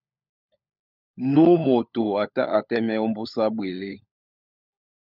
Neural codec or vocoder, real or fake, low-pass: codec, 16 kHz, 16 kbps, FunCodec, trained on LibriTTS, 50 frames a second; fake; 5.4 kHz